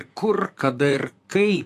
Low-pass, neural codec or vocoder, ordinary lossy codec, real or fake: 14.4 kHz; vocoder, 44.1 kHz, 128 mel bands, Pupu-Vocoder; AAC, 64 kbps; fake